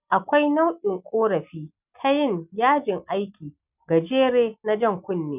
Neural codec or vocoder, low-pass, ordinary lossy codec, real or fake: none; 3.6 kHz; none; real